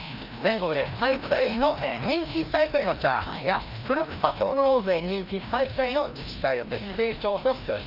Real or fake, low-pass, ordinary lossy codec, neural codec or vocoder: fake; 5.4 kHz; none; codec, 16 kHz, 1 kbps, FreqCodec, larger model